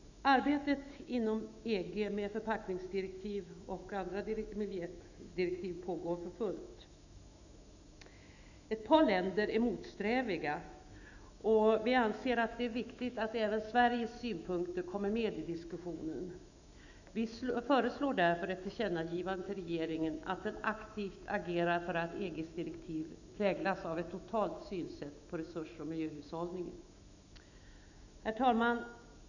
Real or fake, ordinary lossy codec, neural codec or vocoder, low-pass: fake; none; autoencoder, 48 kHz, 128 numbers a frame, DAC-VAE, trained on Japanese speech; 7.2 kHz